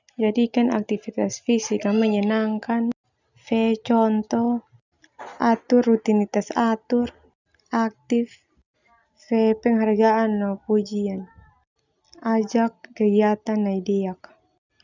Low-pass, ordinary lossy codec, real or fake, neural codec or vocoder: 7.2 kHz; none; real; none